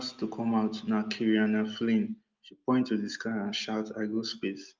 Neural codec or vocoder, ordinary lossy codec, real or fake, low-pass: none; Opus, 24 kbps; real; 7.2 kHz